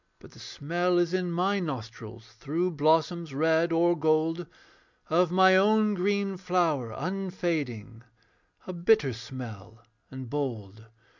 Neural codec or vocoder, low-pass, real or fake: none; 7.2 kHz; real